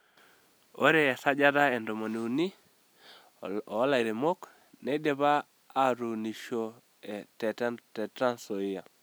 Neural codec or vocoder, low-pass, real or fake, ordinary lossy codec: none; none; real; none